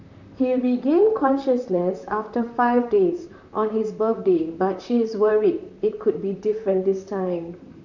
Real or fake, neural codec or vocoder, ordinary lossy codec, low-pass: fake; vocoder, 44.1 kHz, 128 mel bands, Pupu-Vocoder; none; 7.2 kHz